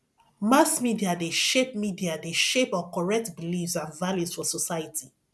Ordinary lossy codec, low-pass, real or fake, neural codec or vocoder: none; none; real; none